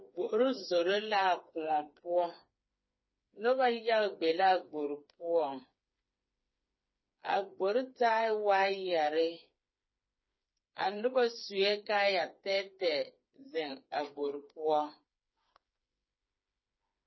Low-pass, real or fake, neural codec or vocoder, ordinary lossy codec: 7.2 kHz; fake; codec, 16 kHz, 4 kbps, FreqCodec, smaller model; MP3, 24 kbps